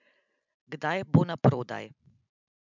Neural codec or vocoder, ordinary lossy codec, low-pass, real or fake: none; none; 7.2 kHz; real